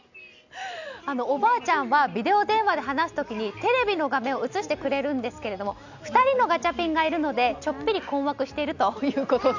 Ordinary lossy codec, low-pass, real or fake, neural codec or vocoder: none; 7.2 kHz; real; none